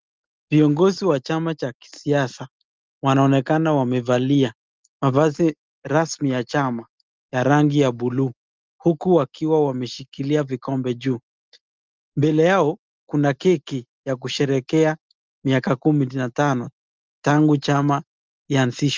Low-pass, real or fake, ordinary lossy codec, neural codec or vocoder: 7.2 kHz; real; Opus, 32 kbps; none